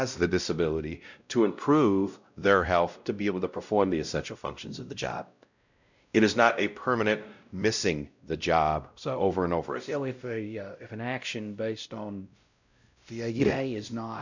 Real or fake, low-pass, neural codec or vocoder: fake; 7.2 kHz; codec, 16 kHz, 0.5 kbps, X-Codec, WavLM features, trained on Multilingual LibriSpeech